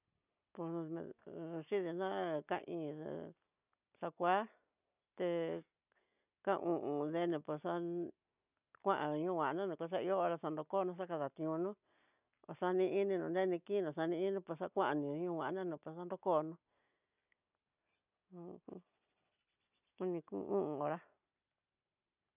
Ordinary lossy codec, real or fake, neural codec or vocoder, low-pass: none; real; none; 3.6 kHz